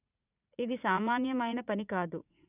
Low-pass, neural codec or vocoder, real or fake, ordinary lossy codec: 3.6 kHz; vocoder, 44.1 kHz, 80 mel bands, Vocos; fake; none